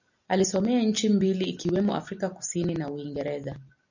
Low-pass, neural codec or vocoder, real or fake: 7.2 kHz; none; real